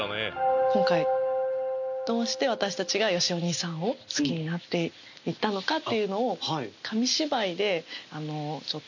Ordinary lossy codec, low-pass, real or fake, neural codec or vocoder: none; 7.2 kHz; real; none